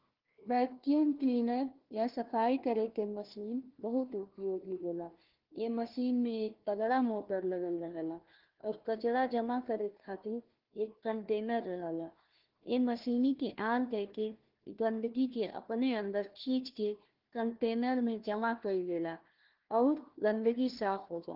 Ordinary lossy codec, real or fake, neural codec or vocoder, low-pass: Opus, 16 kbps; fake; codec, 16 kHz, 1 kbps, FunCodec, trained on Chinese and English, 50 frames a second; 5.4 kHz